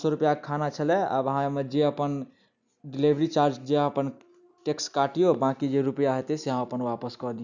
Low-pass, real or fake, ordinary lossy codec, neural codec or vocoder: 7.2 kHz; real; none; none